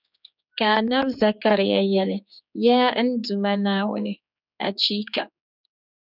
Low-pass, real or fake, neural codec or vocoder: 5.4 kHz; fake; codec, 16 kHz, 4 kbps, X-Codec, HuBERT features, trained on general audio